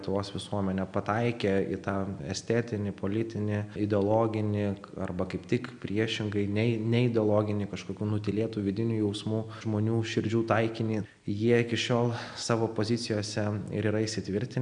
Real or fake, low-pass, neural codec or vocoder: real; 9.9 kHz; none